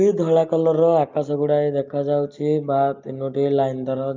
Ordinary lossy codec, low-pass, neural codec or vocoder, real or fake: Opus, 24 kbps; 7.2 kHz; none; real